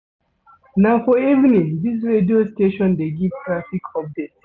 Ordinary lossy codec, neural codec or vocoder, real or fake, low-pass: none; none; real; 7.2 kHz